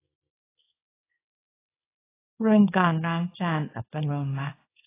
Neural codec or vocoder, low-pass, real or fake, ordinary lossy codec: codec, 24 kHz, 0.9 kbps, WavTokenizer, small release; 3.6 kHz; fake; AAC, 16 kbps